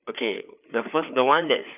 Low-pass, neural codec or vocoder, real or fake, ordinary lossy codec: 3.6 kHz; codec, 16 kHz, 4 kbps, FreqCodec, larger model; fake; none